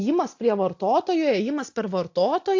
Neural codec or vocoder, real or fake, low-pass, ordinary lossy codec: none; real; 7.2 kHz; AAC, 48 kbps